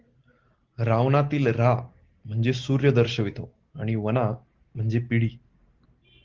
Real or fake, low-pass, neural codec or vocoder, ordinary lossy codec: real; 7.2 kHz; none; Opus, 16 kbps